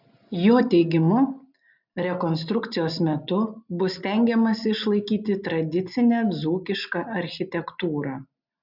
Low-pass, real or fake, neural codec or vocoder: 5.4 kHz; real; none